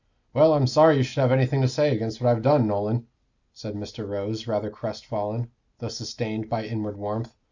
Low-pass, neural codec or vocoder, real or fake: 7.2 kHz; none; real